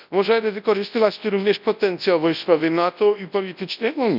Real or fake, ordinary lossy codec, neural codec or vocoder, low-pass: fake; none; codec, 24 kHz, 0.9 kbps, WavTokenizer, large speech release; 5.4 kHz